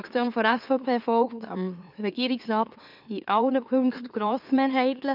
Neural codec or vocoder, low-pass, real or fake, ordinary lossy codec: autoencoder, 44.1 kHz, a latent of 192 numbers a frame, MeloTTS; 5.4 kHz; fake; none